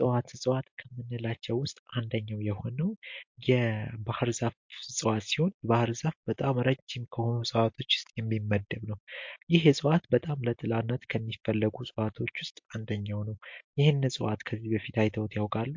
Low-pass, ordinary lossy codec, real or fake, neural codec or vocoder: 7.2 kHz; MP3, 48 kbps; real; none